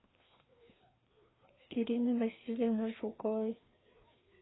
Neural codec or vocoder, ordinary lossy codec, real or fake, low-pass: codec, 16 kHz, 2 kbps, FreqCodec, larger model; AAC, 16 kbps; fake; 7.2 kHz